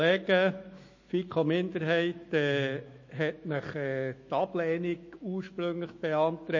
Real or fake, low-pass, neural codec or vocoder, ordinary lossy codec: fake; 7.2 kHz; autoencoder, 48 kHz, 128 numbers a frame, DAC-VAE, trained on Japanese speech; MP3, 32 kbps